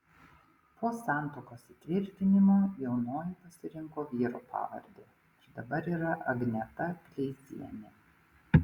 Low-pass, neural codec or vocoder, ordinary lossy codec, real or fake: 19.8 kHz; none; Opus, 64 kbps; real